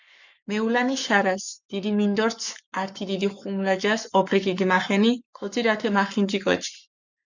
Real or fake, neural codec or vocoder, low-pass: fake; codec, 44.1 kHz, 7.8 kbps, Pupu-Codec; 7.2 kHz